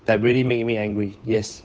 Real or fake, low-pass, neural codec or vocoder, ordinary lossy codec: fake; none; codec, 16 kHz, 8 kbps, FunCodec, trained on Chinese and English, 25 frames a second; none